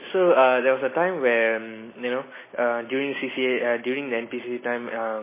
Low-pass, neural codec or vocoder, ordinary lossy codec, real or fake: 3.6 kHz; none; MP3, 16 kbps; real